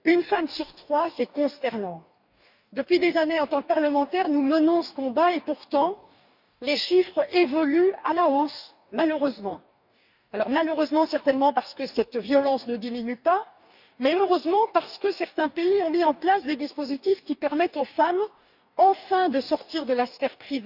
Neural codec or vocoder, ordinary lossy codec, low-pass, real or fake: codec, 44.1 kHz, 2.6 kbps, DAC; none; 5.4 kHz; fake